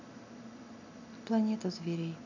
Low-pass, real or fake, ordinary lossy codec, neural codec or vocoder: 7.2 kHz; real; none; none